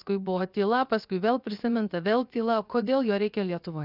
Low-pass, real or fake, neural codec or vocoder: 5.4 kHz; fake; codec, 16 kHz, 0.7 kbps, FocalCodec